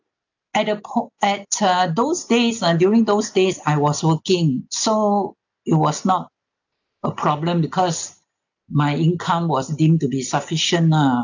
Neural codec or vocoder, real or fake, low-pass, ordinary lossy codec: none; real; 7.2 kHz; none